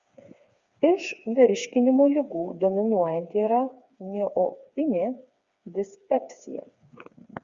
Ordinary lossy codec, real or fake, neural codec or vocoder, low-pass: Opus, 64 kbps; fake; codec, 16 kHz, 4 kbps, FreqCodec, smaller model; 7.2 kHz